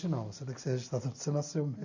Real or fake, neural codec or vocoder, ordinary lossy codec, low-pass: real; none; none; 7.2 kHz